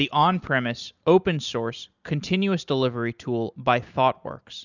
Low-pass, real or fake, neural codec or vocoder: 7.2 kHz; real; none